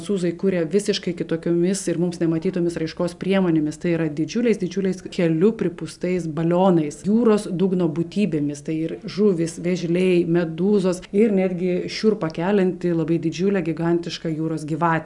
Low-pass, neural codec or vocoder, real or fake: 10.8 kHz; none; real